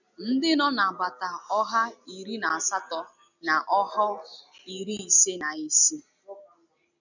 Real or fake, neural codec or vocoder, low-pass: real; none; 7.2 kHz